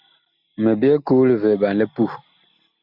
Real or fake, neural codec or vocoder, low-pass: real; none; 5.4 kHz